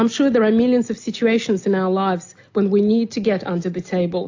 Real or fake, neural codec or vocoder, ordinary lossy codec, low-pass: real; none; AAC, 48 kbps; 7.2 kHz